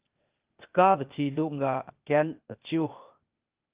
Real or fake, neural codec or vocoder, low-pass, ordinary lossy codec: fake; codec, 16 kHz, 0.8 kbps, ZipCodec; 3.6 kHz; Opus, 32 kbps